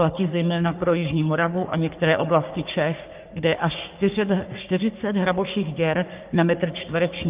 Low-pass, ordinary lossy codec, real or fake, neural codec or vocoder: 3.6 kHz; Opus, 32 kbps; fake; codec, 44.1 kHz, 3.4 kbps, Pupu-Codec